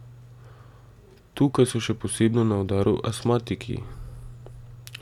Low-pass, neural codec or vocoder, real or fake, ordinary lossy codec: 19.8 kHz; none; real; none